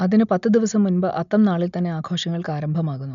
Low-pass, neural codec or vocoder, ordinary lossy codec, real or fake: 7.2 kHz; none; none; real